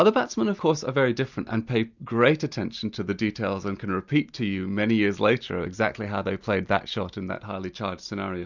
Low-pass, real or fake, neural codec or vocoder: 7.2 kHz; real; none